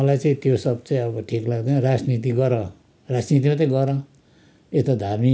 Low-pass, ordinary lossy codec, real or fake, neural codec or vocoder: none; none; real; none